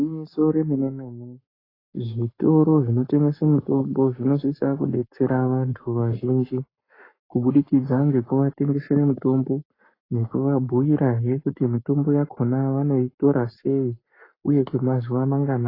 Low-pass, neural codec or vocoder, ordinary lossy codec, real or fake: 5.4 kHz; codec, 44.1 kHz, 7.8 kbps, Pupu-Codec; AAC, 24 kbps; fake